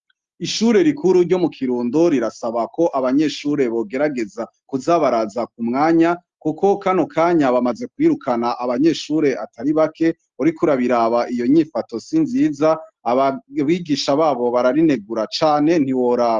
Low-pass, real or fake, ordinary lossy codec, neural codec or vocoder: 7.2 kHz; real; Opus, 32 kbps; none